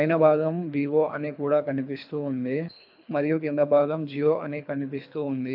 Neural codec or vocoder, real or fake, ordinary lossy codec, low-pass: codec, 24 kHz, 3 kbps, HILCodec; fake; AAC, 48 kbps; 5.4 kHz